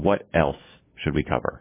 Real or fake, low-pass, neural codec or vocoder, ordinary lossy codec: fake; 3.6 kHz; codec, 16 kHz, 6 kbps, DAC; MP3, 16 kbps